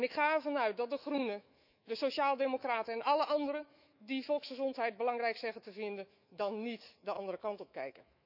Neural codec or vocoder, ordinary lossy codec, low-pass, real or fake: autoencoder, 48 kHz, 128 numbers a frame, DAC-VAE, trained on Japanese speech; none; 5.4 kHz; fake